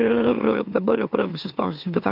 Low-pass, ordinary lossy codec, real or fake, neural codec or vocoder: 5.4 kHz; MP3, 48 kbps; fake; autoencoder, 44.1 kHz, a latent of 192 numbers a frame, MeloTTS